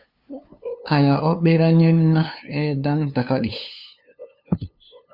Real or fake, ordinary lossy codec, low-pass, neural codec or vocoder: fake; Opus, 64 kbps; 5.4 kHz; codec, 16 kHz, 2 kbps, FunCodec, trained on LibriTTS, 25 frames a second